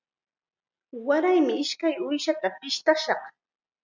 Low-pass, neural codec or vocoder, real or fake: 7.2 kHz; none; real